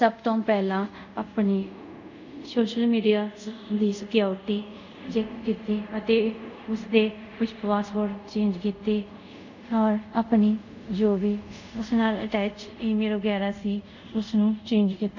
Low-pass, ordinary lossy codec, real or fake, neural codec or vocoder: 7.2 kHz; none; fake; codec, 24 kHz, 0.5 kbps, DualCodec